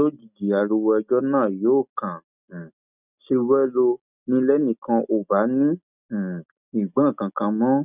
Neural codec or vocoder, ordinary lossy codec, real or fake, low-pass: none; none; real; 3.6 kHz